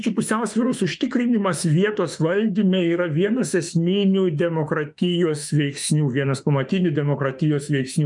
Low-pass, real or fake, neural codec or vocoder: 10.8 kHz; fake; autoencoder, 48 kHz, 32 numbers a frame, DAC-VAE, trained on Japanese speech